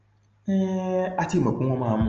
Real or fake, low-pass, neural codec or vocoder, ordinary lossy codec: real; 7.2 kHz; none; Opus, 24 kbps